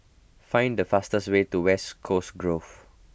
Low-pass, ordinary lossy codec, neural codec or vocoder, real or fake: none; none; none; real